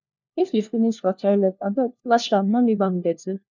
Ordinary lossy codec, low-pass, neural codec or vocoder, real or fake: none; 7.2 kHz; codec, 16 kHz, 1 kbps, FunCodec, trained on LibriTTS, 50 frames a second; fake